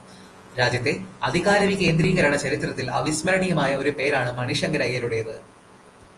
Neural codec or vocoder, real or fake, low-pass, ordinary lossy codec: vocoder, 48 kHz, 128 mel bands, Vocos; fake; 10.8 kHz; Opus, 24 kbps